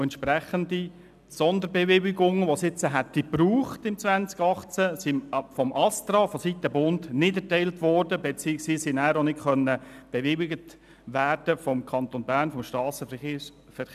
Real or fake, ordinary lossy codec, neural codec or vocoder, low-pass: real; none; none; 14.4 kHz